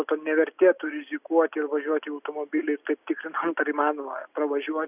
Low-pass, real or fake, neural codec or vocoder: 3.6 kHz; real; none